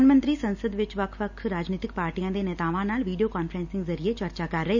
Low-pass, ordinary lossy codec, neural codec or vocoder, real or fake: 7.2 kHz; none; none; real